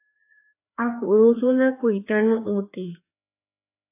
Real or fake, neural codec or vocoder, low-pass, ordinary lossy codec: fake; codec, 16 kHz, 2 kbps, FreqCodec, larger model; 3.6 kHz; AAC, 24 kbps